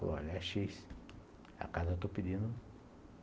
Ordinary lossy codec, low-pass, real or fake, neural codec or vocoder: none; none; real; none